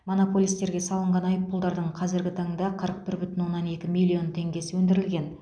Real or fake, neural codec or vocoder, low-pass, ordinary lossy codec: real; none; 9.9 kHz; none